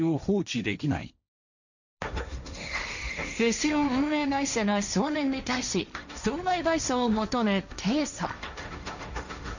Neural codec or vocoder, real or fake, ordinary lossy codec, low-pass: codec, 16 kHz, 1.1 kbps, Voila-Tokenizer; fake; none; 7.2 kHz